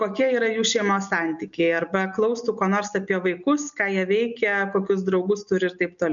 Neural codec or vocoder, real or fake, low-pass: none; real; 7.2 kHz